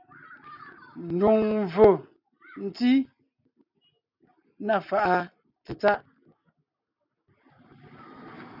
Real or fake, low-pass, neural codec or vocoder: real; 5.4 kHz; none